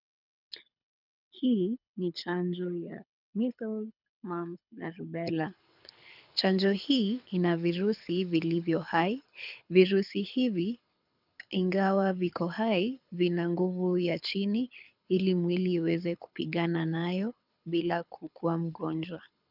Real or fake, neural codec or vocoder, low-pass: fake; codec, 24 kHz, 6 kbps, HILCodec; 5.4 kHz